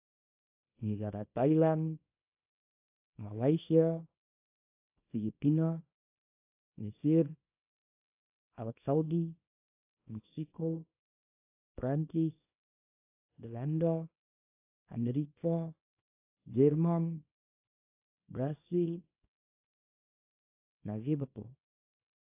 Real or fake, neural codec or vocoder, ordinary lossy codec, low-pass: fake; codec, 24 kHz, 0.9 kbps, WavTokenizer, small release; AAC, 32 kbps; 3.6 kHz